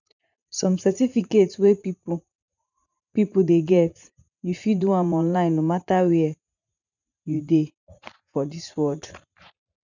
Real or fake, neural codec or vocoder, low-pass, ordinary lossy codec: fake; vocoder, 44.1 kHz, 80 mel bands, Vocos; 7.2 kHz; none